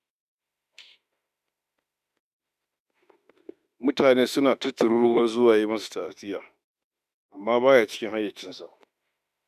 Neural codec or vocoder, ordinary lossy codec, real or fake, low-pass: autoencoder, 48 kHz, 32 numbers a frame, DAC-VAE, trained on Japanese speech; none; fake; 14.4 kHz